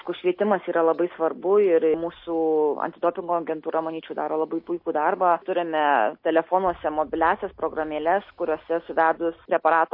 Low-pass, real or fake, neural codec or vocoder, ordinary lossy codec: 5.4 kHz; real; none; MP3, 32 kbps